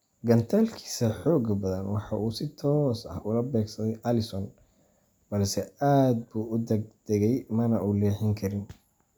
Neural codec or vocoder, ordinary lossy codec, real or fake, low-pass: none; none; real; none